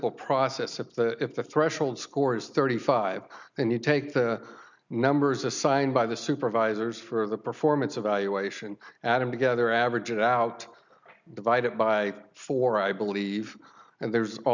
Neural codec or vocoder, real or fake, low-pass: none; real; 7.2 kHz